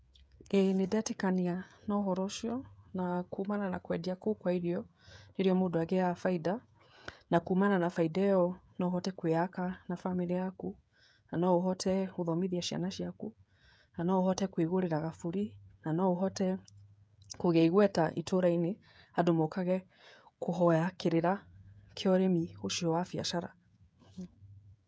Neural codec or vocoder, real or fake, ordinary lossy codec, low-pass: codec, 16 kHz, 16 kbps, FreqCodec, smaller model; fake; none; none